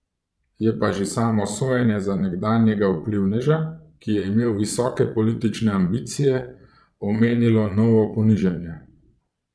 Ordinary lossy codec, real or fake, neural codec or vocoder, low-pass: none; fake; vocoder, 22.05 kHz, 80 mel bands, Vocos; none